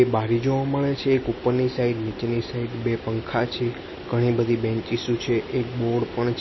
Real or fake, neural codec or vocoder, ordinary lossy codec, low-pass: real; none; MP3, 24 kbps; 7.2 kHz